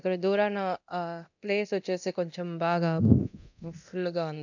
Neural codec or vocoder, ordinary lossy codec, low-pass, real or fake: codec, 24 kHz, 0.9 kbps, DualCodec; none; 7.2 kHz; fake